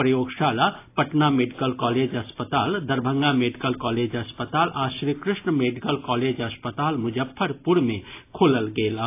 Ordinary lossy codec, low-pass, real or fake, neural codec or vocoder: AAC, 24 kbps; 3.6 kHz; real; none